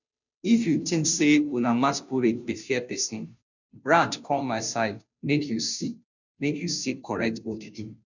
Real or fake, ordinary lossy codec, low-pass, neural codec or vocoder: fake; none; 7.2 kHz; codec, 16 kHz, 0.5 kbps, FunCodec, trained on Chinese and English, 25 frames a second